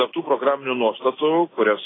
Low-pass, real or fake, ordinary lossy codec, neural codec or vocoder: 7.2 kHz; real; AAC, 16 kbps; none